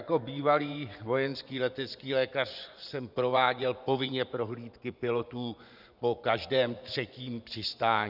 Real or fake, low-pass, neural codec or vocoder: fake; 5.4 kHz; vocoder, 24 kHz, 100 mel bands, Vocos